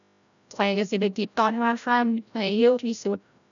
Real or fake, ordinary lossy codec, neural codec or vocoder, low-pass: fake; none; codec, 16 kHz, 0.5 kbps, FreqCodec, larger model; 7.2 kHz